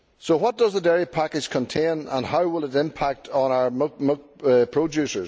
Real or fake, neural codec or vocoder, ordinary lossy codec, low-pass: real; none; none; none